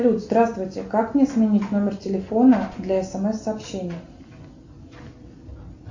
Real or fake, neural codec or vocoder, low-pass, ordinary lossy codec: real; none; 7.2 kHz; AAC, 48 kbps